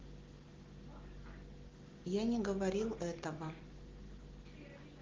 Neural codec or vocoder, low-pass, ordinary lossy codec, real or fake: none; 7.2 kHz; Opus, 16 kbps; real